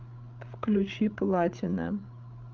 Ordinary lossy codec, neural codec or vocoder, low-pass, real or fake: Opus, 24 kbps; codec, 16 kHz, 8 kbps, FreqCodec, larger model; 7.2 kHz; fake